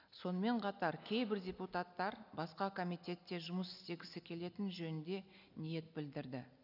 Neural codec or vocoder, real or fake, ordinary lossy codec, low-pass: none; real; none; 5.4 kHz